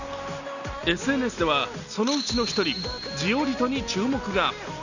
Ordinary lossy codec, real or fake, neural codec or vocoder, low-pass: none; real; none; 7.2 kHz